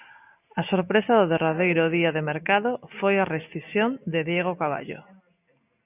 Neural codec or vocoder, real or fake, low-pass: none; real; 3.6 kHz